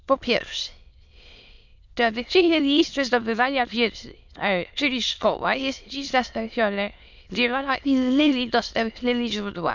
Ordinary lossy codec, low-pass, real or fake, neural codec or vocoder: none; 7.2 kHz; fake; autoencoder, 22.05 kHz, a latent of 192 numbers a frame, VITS, trained on many speakers